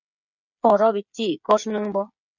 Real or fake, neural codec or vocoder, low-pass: fake; codec, 16 kHz, 8 kbps, FreqCodec, smaller model; 7.2 kHz